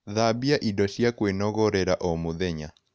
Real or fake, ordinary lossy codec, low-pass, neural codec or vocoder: real; none; none; none